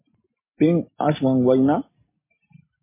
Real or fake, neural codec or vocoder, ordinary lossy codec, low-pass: real; none; MP3, 16 kbps; 3.6 kHz